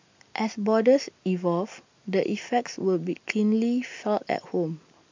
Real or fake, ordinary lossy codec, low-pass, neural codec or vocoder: real; MP3, 64 kbps; 7.2 kHz; none